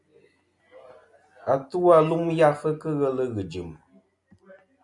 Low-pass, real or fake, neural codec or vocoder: 10.8 kHz; real; none